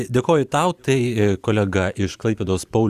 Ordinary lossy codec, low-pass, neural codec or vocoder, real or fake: Opus, 64 kbps; 14.4 kHz; vocoder, 44.1 kHz, 128 mel bands every 512 samples, BigVGAN v2; fake